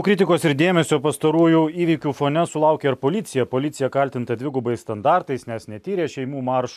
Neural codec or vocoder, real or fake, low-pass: none; real; 14.4 kHz